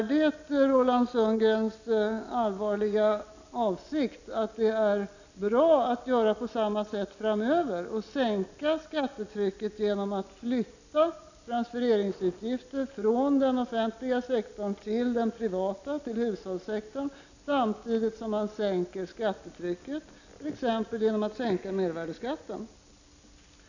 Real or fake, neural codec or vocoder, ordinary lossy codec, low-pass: real; none; none; 7.2 kHz